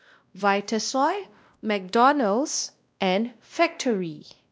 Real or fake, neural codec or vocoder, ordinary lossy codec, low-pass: fake; codec, 16 kHz, 1 kbps, X-Codec, WavLM features, trained on Multilingual LibriSpeech; none; none